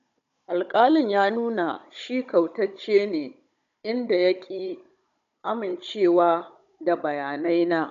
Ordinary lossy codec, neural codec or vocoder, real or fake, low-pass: none; codec, 16 kHz, 16 kbps, FunCodec, trained on Chinese and English, 50 frames a second; fake; 7.2 kHz